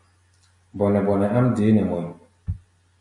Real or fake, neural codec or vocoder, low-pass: real; none; 10.8 kHz